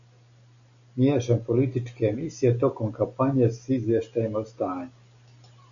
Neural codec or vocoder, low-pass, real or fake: none; 7.2 kHz; real